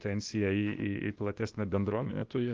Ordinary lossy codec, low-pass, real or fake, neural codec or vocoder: Opus, 24 kbps; 7.2 kHz; fake; codec, 16 kHz, 0.8 kbps, ZipCodec